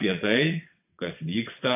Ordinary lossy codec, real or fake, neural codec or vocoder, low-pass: AAC, 32 kbps; fake; vocoder, 22.05 kHz, 80 mel bands, WaveNeXt; 3.6 kHz